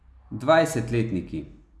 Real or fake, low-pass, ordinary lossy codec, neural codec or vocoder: real; none; none; none